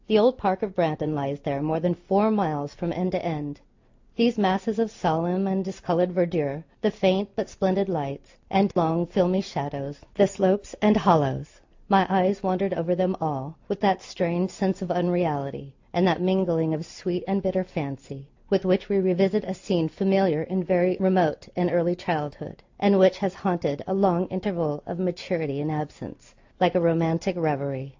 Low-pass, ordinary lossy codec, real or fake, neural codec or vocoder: 7.2 kHz; AAC, 48 kbps; real; none